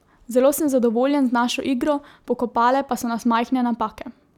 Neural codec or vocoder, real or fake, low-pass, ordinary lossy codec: none; real; 19.8 kHz; none